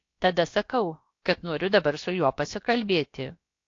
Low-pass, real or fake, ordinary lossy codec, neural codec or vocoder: 7.2 kHz; fake; AAC, 48 kbps; codec, 16 kHz, about 1 kbps, DyCAST, with the encoder's durations